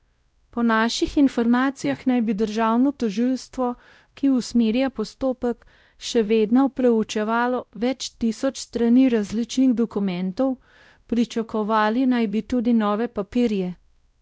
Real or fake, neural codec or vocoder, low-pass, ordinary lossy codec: fake; codec, 16 kHz, 0.5 kbps, X-Codec, WavLM features, trained on Multilingual LibriSpeech; none; none